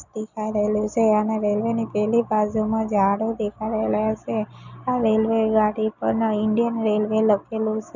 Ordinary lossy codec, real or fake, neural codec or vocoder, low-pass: none; real; none; 7.2 kHz